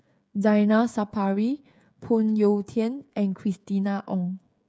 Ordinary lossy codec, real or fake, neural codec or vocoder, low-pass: none; fake; codec, 16 kHz, 16 kbps, FreqCodec, smaller model; none